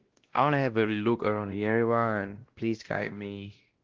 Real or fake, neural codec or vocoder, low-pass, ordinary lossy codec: fake; codec, 16 kHz, 1 kbps, X-Codec, WavLM features, trained on Multilingual LibriSpeech; 7.2 kHz; Opus, 16 kbps